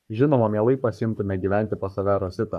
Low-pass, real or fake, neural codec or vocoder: 14.4 kHz; fake; codec, 44.1 kHz, 3.4 kbps, Pupu-Codec